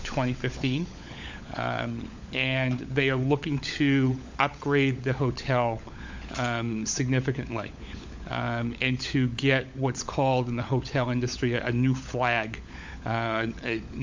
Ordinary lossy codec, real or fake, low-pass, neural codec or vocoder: MP3, 64 kbps; fake; 7.2 kHz; codec, 16 kHz, 16 kbps, FunCodec, trained on LibriTTS, 50 frames a second